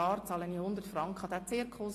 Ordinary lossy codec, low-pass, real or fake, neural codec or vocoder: AAC, 48 kbps; 14.4 kHz; real; none